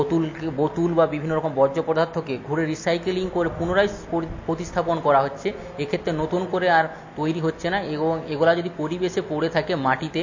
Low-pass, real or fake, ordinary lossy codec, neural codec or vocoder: 7.2 kHz; real; MP3, 32 kbps; none